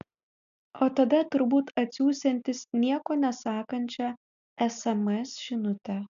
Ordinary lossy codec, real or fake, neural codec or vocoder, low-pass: MP3, 96 kbps; real; none; 7.2 kHz